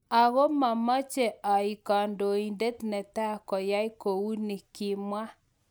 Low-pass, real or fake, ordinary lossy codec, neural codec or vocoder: none; real; none; none